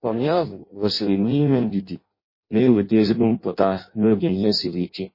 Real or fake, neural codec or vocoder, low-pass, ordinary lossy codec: fake; codec, 16 kHz in and 24 kHz out, 0.6 kbps, FireRedTTS-2 codec; 5.4 kHz; MP3, 24 kbps